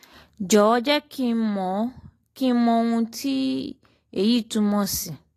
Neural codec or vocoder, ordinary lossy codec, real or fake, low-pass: none; AAC, 48 kbps; real; 14.4 kHz